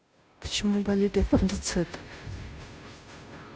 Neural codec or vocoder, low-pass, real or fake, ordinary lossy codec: codec, 16 kHz, 0.5 kbps, FunCodec, trained on Chinese and English, 25 frames a second; none; fake; none